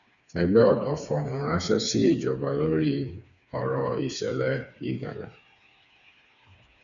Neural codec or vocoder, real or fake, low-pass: codec, 16 kHz, 4 kbps, FreqCodec, smaller model; fake; 7.2 kHz